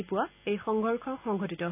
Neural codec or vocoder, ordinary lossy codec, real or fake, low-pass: none; none; real; 3.6 kHz